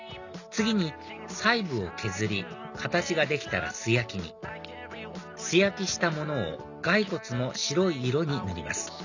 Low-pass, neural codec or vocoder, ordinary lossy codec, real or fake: 7.2 kHz; none; none; real